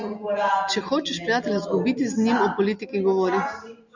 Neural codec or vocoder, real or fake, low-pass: none; real; 7.2 kHz